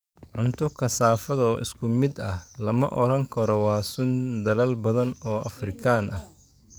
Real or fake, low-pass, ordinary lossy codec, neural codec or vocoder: fake; none; none; codec, 44.1 kHz, 7.8 kbps, DAC